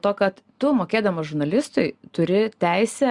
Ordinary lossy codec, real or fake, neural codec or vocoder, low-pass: Opus, 64 kbps; real; none; 10.8 kHz